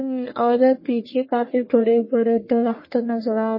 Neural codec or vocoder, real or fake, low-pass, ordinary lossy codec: codec, 44.1 kHz, 1.7 kbps, Pupu-Codec; fake; 5.4 kHz; MP3, 24 kbps